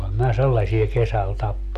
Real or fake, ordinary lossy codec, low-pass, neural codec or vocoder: real; none; 14.4 kHz; none